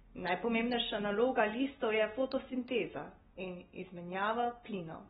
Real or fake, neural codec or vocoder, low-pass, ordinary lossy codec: real; none; 19.8 kHz; AAC, 16 kbps